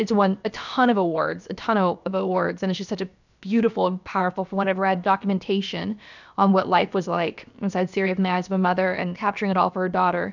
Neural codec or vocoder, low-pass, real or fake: codec, 16 kHz, about 1 kbps, DyCAST, with the encoder's durations; 7.2 kHz; fake